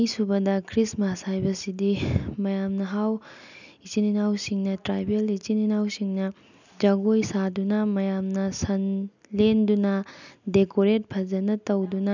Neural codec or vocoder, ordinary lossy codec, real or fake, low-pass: none; none; real; 7.2 kHz